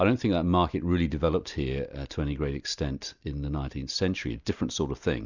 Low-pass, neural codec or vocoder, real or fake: 7.2 kHz; none; real